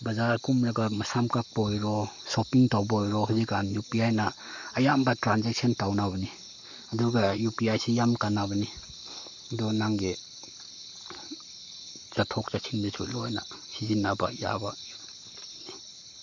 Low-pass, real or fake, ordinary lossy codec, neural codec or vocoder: 7.2 kHz; fake; none; codec, 44.1 kHz, 7.8 kbps, Pupu-Codec